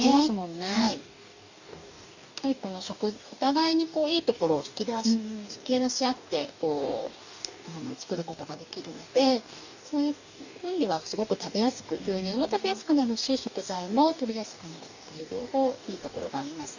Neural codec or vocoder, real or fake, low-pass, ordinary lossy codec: codec, 44.1 kHz, 2.6 kbps, DAC; fake; 7.2 kHz; none